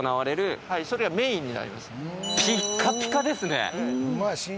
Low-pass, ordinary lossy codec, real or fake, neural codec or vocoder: none; none; real; none